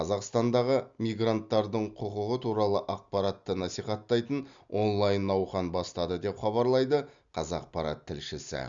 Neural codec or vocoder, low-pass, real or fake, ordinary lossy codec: none; 7.2 kHz; real; Opus, 64 kbps